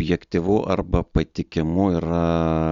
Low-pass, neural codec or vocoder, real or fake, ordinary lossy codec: 7.2 kHz; none; real; Opus, 64 kbps